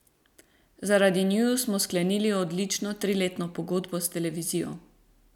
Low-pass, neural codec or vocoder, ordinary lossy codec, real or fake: 19.8 kHz; none; none; real